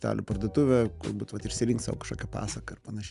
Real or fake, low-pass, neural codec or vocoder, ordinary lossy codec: real; 10.8 kHz; none; Opus, 64 kbps